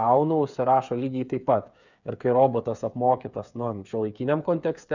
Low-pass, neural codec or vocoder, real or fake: 7.2 kHz; codec, 16 kHz, 8 kbps, FreqCodec, smaller model; fake